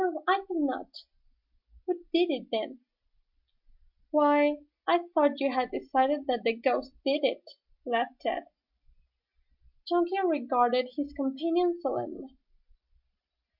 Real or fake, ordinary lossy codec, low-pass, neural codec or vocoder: real; MP3, 48 kbps; 5.4 kHz; none